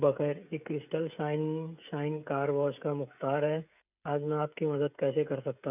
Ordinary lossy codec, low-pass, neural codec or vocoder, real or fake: none; 3.6 kHz; codec, 16 kHz, 16 kbps, FreqCodec, smaller model; fake